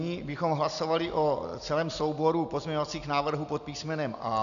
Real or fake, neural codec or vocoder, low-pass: real; none; 7.2 kHz